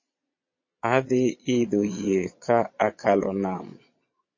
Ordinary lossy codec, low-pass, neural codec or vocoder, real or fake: MP3, 32 kbps; 7.2 kHz; vocoder, 22.05 kHz, 80 mel bands, Vocos; fake